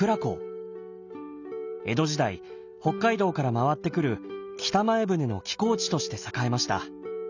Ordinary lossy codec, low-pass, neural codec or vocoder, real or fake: none; 7.2 kHz; none; real